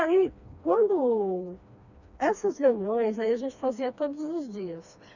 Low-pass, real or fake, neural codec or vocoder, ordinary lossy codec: 7.2 kHz; fake; codec, 16 kHz, 2 kbps, FreqCodec, smaller model; none